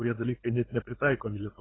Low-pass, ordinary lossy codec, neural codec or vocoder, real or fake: 7.2 kHz; AAC, 16 kbps; codec, 16 kHz, 8 kbps, FunCodec, trained on LibriTTS, 25 frames a second; fake